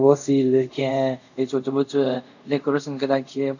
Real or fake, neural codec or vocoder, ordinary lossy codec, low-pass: fake; codec, 24 kHz, 0.5 kbps, DualCodec; none; 7.2 kHz